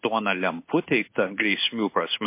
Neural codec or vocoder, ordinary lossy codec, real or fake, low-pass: codec, 16 kHz in and 24 kHz out, 1 kbps, XY-Tokenizer; MP3, 24 kbps; fake; 3.6 kHz